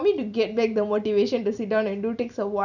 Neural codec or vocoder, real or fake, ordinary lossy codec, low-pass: none; real; none; 7.2 kHz